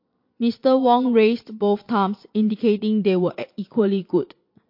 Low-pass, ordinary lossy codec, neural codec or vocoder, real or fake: 5.4 kHz; MP3, 32 kbps; vocoder, 22.05 kHz, 80 mel bands, Vocos; fake